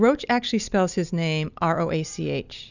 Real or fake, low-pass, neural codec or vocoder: real; 7.2 kHz; none